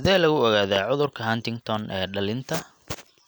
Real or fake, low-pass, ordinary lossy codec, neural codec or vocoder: real; none; none; none